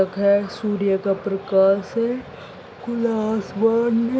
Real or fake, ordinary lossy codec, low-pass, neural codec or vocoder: real; none; none; none